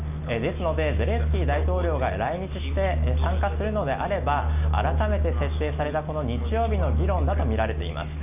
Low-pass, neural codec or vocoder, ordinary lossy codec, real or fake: 3.6 kHz; none; none; real